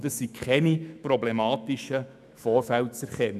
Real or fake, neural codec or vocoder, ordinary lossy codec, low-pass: fake; autoencoder, 48 kHz, 128 numbers a frame, DAC-VAE, trained on Japanese speech; none; 14.4 kHz